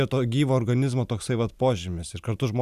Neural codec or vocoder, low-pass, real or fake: none; 14.4 kHz; real